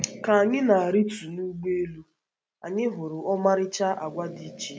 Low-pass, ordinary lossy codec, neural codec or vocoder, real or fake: none; none; none; real